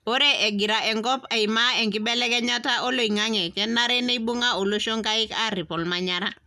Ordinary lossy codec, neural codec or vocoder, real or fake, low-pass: none; none; real; 14.4 kHz